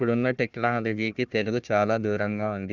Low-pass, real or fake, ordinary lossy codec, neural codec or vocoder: 7.2 kHz; fake; none; codec, 16 kHz, 1 kbps, FunCodec, trained on Chinese and English, 50 frames a second